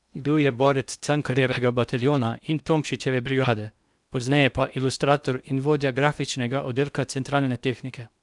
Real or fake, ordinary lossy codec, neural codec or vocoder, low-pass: fake; none; codec, 16 kHz in and 24 kHz out, 0.8 kbps, FocalCodec, streaming, 65536 codes; 10.8 kHz